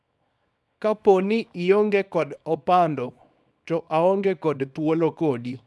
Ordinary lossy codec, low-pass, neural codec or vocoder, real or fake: none; none; codec, 24 kHz, 0.9 kbps, WavTokenizer, small release; fake